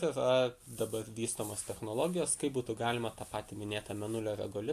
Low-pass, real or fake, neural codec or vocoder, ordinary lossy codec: 14.4 kHz; real; none; AAC, 64 kbps